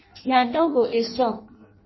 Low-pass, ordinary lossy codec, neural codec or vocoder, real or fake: 7.2 kHz; MP3, 24 kbps; codec, 16 kHz in and 24 kHz out, 0.6 kbps, FireRedTTS-2 codec; fake